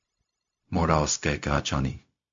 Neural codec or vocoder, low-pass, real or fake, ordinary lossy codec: codec, 16 kHz, 0.4 kbps, LongCat-Audio-Codec; 7.2 kHz; fake; MP3, 48 kbps